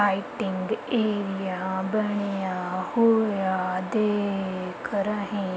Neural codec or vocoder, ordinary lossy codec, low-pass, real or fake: none; none; none; real